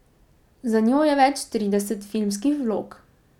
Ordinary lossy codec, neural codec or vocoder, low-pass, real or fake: none; none; 19.8 kHz; real